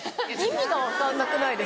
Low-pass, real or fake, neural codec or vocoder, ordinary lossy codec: none; real; none; none